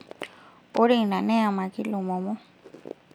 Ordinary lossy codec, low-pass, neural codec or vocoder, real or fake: none; 19.8 kHz; none; real